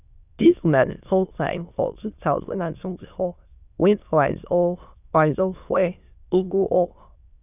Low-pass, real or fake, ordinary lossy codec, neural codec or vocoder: 3.6 kHz; fake; none; autoencoder, 22.05 kHz, a latent of 192 numbers a frame, VITS, trained on many speakers